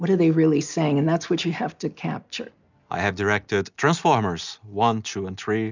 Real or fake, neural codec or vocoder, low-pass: real; none; 7.2 kHz